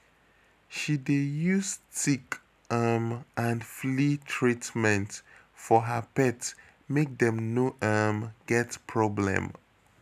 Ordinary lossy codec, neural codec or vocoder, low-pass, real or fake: none; none; 14.4 kHz; real